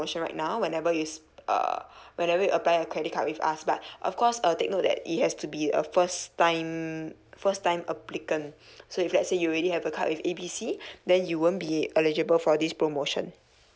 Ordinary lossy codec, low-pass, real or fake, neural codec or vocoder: none; none; real; none